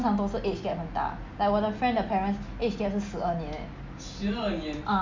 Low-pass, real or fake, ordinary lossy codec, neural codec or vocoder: 7.2 kHz; real; MP3, 64 kbps; none